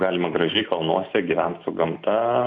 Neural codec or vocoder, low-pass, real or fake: none; 7.2 kHz; real